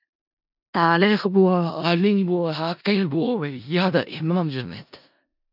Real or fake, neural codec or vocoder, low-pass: fake; codec, 16 kHz in and 24 kHz out, 0.4 kbps, LongCat-Audio-Codec, four codebook decoder; 5.4 kHz